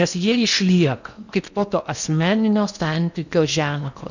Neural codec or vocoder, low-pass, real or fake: codec, 16 kHz in and 24 kHz out, 0.8 kbps, FocalCodec, streaming, 65536 codes; 7.2 kHz; fake